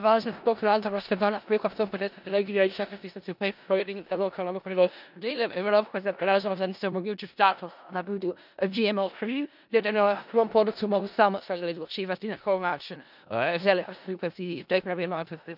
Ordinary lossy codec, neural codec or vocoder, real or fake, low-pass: none; codec, 16 kHz in and 24 kHz out, 0.4 kbps, LongCat-Audio-Codec, four codebook decoder; fake; 5.4 kHz